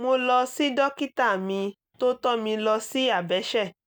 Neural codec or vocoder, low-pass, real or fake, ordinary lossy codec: none; none; real; none